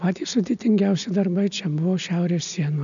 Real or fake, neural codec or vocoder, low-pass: real; none; 7.2 kHz